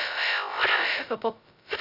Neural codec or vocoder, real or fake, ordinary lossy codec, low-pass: codec, 16 kHz, 0.2 kbps, FocalCodec; fake; none; 5.4 kHz